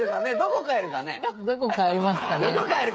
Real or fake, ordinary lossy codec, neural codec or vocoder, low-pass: fake; none; codec, 16 kHz, 8 kbps, FreqCodec, smaller model; none